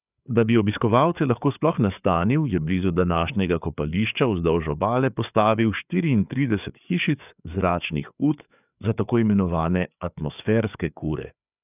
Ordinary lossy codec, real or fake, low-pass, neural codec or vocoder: none; fake; 3.6 kHz; codec, 16 kHz, 4 kbps, FreqCodec, larger model